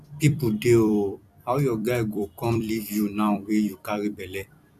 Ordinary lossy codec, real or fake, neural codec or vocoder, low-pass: none; fake; vocoder, 44.1 kHz, 128 mel bands every 256 samples, BigVGAN v2; 14.4 kHz